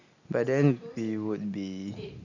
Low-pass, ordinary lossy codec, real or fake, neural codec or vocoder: 7.2 kHz; none; real; none